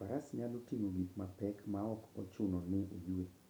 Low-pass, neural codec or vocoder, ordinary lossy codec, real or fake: none; none; none; real